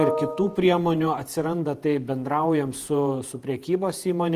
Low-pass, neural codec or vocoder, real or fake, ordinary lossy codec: 14.4 kHz; vocoder, 48 kHz, 128 mel bands, Vocos; fake; Opus, 24 kbps